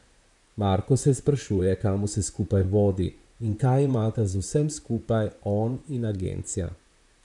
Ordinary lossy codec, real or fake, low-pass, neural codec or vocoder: none; fake; 10.8 kHz; vocoder, 44.1 kHz, 128 mel bands, Pupu-Vocoder